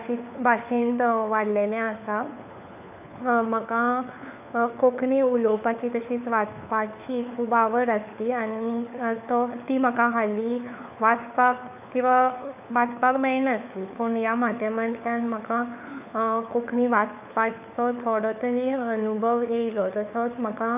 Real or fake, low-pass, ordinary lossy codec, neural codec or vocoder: fake; 3.6 kHz; none; codec, 16 kHz, 4 kbps, FunCodec, trained on LibriTTS, 50 frames a second